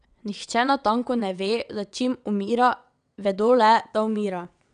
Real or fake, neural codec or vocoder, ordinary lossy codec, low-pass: fake; vocoder, 22.05 kHz, 80 mel bands, WaveNeXt; none; 9.9 kHz